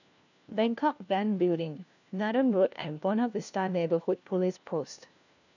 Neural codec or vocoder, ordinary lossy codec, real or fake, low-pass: codec, 16 kHz, 1 kbps, FunCodec, trained on LibriTTS, 50 frames a second; none; fake; 7.2 kHz